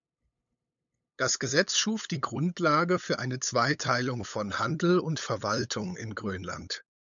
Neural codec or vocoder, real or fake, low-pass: codec, 16 kHz, 8 kbps, FunCodec, trained on LibriTTS, 25 frames a second; fake; 7.2 kHz